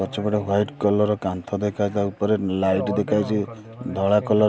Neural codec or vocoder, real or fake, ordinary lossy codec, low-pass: none; real; none; none